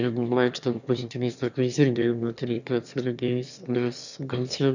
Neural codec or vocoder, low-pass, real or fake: autoencoder, 22.05 kHz, a latent of 192 numbers a frame, VITS, trained on one speaker; 7.2 kHz; fake